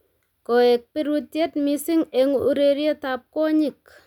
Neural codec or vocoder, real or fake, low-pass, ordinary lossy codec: none; real; 19.8 kHz; none